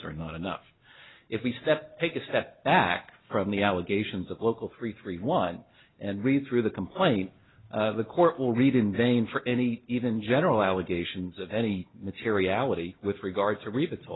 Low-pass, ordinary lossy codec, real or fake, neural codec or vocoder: 7.2 kHz; AAC, 16 kbps; real; none